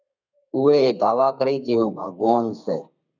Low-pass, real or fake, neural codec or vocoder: 7.2 kHz; fake; codec, 32 kHz, 1.9 kbps, SNAC